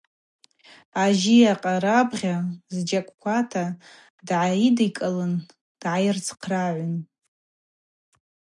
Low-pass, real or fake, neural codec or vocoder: 10.8 kHz; real; none